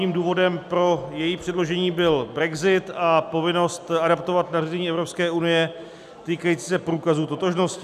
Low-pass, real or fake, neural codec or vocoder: 14.4 kHz; real; none